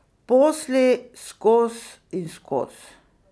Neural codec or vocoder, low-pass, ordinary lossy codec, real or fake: none; none; none; real